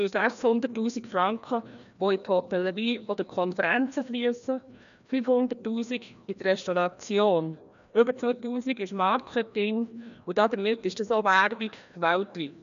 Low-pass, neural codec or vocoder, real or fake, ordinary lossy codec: 7.2 kHz; codec, 16 kHz, 1 kbps, FreqCodec, larger model; fake; none